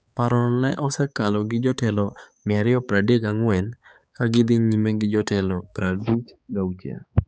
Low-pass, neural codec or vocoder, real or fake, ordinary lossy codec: none; codec, 16 kHz, 4 kbps, X-Codec, HuBERT features, trained on balanced general audio; fake; none